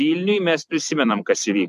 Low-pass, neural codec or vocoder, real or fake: 14.4 kHz; vocoder, 44.1 kHz, 128 mel bands every 256 samples, BigVGAN v2; fake